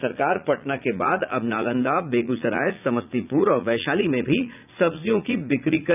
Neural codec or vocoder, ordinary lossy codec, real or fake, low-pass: vocoder, 44.1 kHz, 80 mel bands, Vocos; none; fake; 3.6 kHz